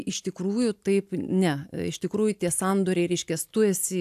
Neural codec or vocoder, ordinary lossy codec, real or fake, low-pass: none; AAC, 96 kbps; real; 14.4 kHz